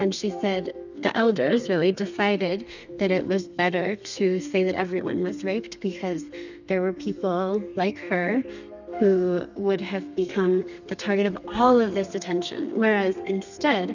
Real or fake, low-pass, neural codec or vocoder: fake; 7.2 kHz; codec, 32 kHz, 1.9 kbps, SNAC